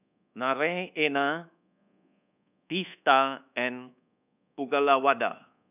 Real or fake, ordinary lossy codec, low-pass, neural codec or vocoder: fake; none; 3.6 kHz; codec, 24 kHz, 1.2 kbps, DualCodec